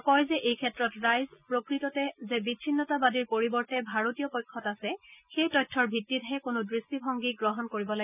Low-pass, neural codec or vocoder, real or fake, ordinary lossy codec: 3.6 kHz; none; real; none